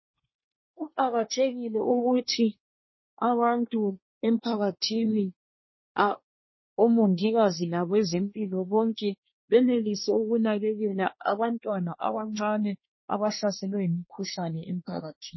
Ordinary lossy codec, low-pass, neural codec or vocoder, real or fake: MP3, 24 kbps; 7.2 kHz; codec, 24 kHz, 1 kbps, SNAC; fake